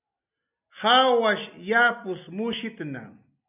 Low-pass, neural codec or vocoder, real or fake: 3.6 kHz; none; real